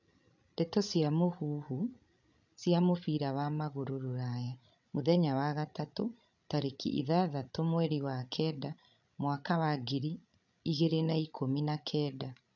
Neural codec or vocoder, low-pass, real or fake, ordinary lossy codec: codec, 16 kHz, 16 kbps, FreqCodec, larger model; 7.2 kHz; fake; none